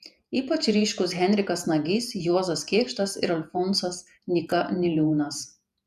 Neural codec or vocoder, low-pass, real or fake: vocoder, 48 kHz, 128 mel bands, Vocos; 14.4 kHz; fake